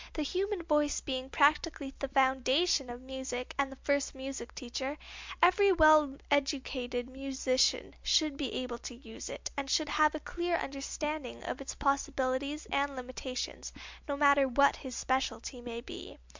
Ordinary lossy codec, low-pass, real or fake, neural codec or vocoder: MP3, 64 kbps; 7.2 kHz; real; none